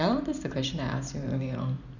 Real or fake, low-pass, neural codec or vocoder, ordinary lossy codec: fake; 7.2 kHz; vocoder, 44.1 kHz, 128 mel bands every 512 samples, BigVGAN v2; none